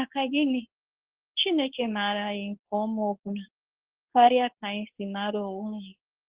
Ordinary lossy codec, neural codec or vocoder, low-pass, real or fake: Opus, 16 kbps; codec, 24 kHz, 0.9 kbps, WavTokenizer, medium speech release version 2; 3.6 kHz; fake